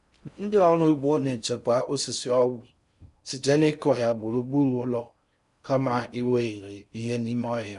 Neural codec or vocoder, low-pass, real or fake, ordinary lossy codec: codec, 16 kHz in and 24 kHz out, 0.6 kbps, FocalCodec, streaming, 4096 codes; 10.8 kHz; fake; none